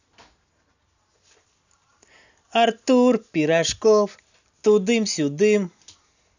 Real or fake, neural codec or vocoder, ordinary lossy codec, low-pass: real; none; none; 7.2 kHz